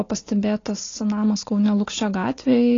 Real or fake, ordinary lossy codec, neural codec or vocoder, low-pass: real; AAC, 32 kbps; none; 7.2 kHz